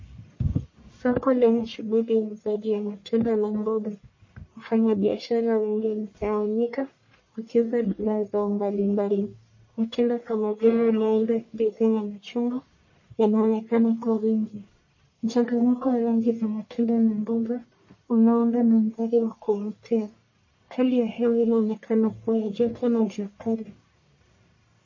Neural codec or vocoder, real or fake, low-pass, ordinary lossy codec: codec, 44.1 kHz, 1.7 kbps, Pupu-Codec; fake; 7.2 kHz; MP3, 32 kbps